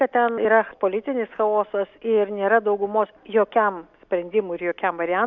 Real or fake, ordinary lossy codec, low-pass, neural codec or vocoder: real; MP3, 64 kbps; 7.2 kHz; none